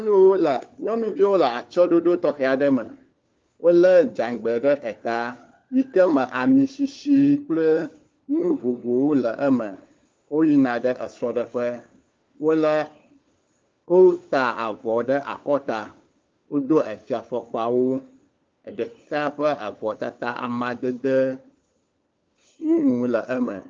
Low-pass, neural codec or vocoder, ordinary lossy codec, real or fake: 7.2 kHz; codec, 16 kHz, 4 kbps, FunCodec, trained on LibriTTS, 50 frames a second; Opus, 24 kbps; fake